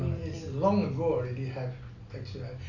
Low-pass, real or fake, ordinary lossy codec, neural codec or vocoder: 7.2 kHz; real; none; none